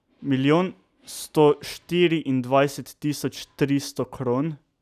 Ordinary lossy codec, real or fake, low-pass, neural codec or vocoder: none; real; 14.4 kHz; none